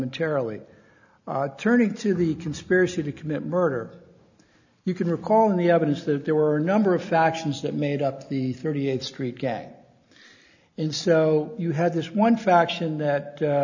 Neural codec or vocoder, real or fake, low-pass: none; real; 7.2 kHz